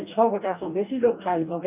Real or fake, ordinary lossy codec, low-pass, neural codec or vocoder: fake; none; 3.6 kHz; codec, 44.1 kHz, 2.6 kbps, DAC